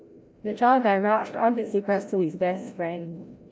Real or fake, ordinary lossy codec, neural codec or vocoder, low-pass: fake; none; codec, 16 kHz, 0.5 kbps, FreqCodec, larger model; none